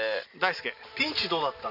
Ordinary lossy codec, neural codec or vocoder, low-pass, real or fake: none; none; 5.4 kHz; real